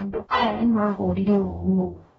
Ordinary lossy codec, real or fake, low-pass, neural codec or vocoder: AAC, 24 kbps; fake; 19.8 kHz; codec, 44.1 kHz, 0.9 kbps, DAC